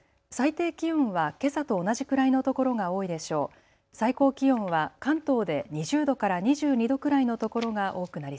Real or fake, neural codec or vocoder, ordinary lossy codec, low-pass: real; none; none; none